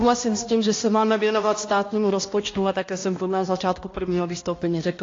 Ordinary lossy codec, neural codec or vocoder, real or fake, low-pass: AAC, 32 kbps; codec, 16 kHz, 1 kbps, X-Codec, HuBERT features, trained on balanced general audio; fake; 7.2 kHz